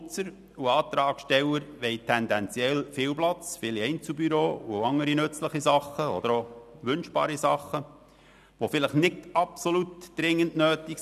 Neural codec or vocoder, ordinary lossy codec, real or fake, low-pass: none; none; real; 14.4 kHz